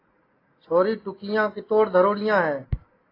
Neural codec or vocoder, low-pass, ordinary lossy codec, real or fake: none; 5.4 kHz; AAC, 24 kbps; real